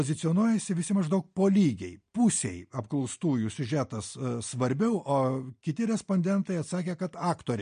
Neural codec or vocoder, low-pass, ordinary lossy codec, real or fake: none; 9.9 kHz; MP3, 48 kbps; real